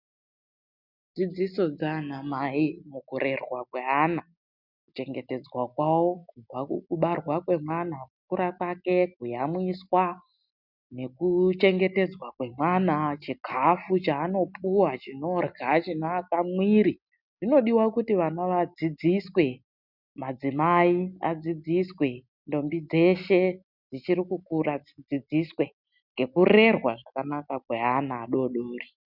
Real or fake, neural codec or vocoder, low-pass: real; none; 5.4 kHz